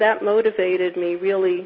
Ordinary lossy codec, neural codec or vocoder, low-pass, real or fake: MP3, 24 kbps; none; 5.4 kHz; real